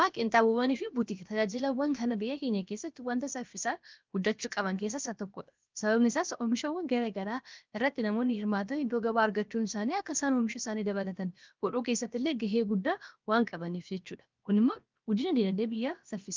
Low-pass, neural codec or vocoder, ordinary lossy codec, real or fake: 7.2 kHz; codec, 16 kHz, about 1 kbps, DyCAST, with the encoder's durations; Opus, 24 kbps; fake